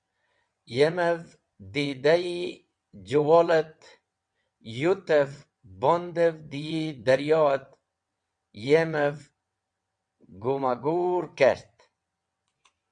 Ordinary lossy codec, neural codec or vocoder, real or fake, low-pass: MP3, 64 kbps; vocoder, 22.05 kHz, 80 mel bands, WaveNeXt; fake; 9.9 kHz